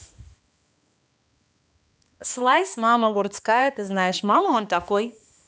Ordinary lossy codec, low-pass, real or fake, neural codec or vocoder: none; none; fake; codec, 16 kHz, 2 kbps, X-Codec, HuBERT features, trained on balanced general audio